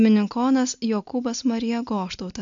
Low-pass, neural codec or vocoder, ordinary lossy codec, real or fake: 7.2 kHz; none; AAC, 64 kbps; real